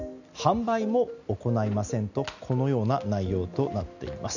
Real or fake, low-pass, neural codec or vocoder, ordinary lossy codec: real; 7.2 kHz; none; none